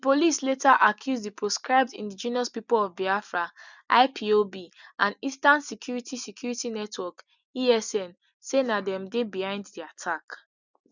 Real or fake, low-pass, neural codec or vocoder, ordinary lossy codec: real; 7.2 kHz; none; none